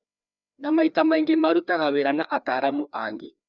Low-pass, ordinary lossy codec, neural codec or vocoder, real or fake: 7.2 kHz; none; codec, 16 kHz, 2 kbps, FreqCodec, larger model; fake